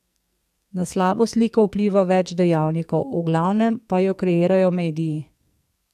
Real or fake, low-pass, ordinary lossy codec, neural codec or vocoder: fake; 14.4 kHz; none; codec, 44.1 kHz, 2.6 kbps, SNAC